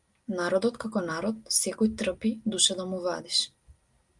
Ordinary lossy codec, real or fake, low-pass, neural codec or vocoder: Opus, 32 kbps; real; 10.8 kHz; none